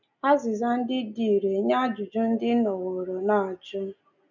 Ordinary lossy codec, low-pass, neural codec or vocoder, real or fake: none; 7.2 kHz; none; real